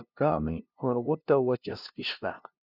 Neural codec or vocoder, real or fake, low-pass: codec, 16 kHz, 0.5 kbps, FunCodec, trained on LibriTTS, 25 frames a second; fake; 5.4 kHz